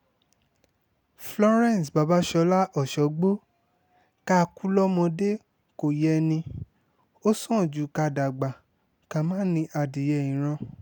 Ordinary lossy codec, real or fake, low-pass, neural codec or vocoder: none; real; none; none